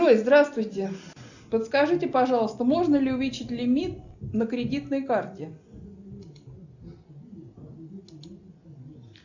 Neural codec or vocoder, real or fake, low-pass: none; real; 7.2 kHz